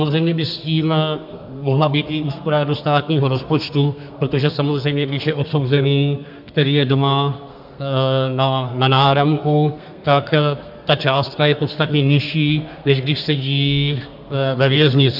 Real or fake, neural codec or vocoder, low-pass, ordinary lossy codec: fake; codec, 32 kHz, 1.9 kbps, SNAC; 5.4 kHz; MP3, 48 kbps